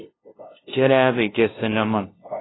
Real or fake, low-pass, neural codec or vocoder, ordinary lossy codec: fake; 7.2 kHz; codec, 16 kHz, 0.5 kbps, FunCodec, trained on LibriTTS, 25 frames a second; AAC, 16 kbps